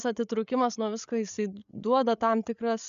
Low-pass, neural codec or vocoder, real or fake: 7.2 kHz; codec, 16 kHz, 8 kbps, FreqCodec, larger model; fake